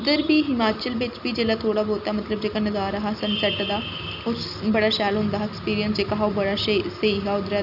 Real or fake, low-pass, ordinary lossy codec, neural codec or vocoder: real; 5.4 kHz; none; none